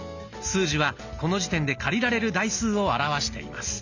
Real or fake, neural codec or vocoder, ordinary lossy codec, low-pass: real; none; none; 7.2 kHz